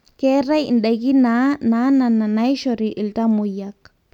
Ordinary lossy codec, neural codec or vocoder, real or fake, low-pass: none; none; real; 19.8 kHz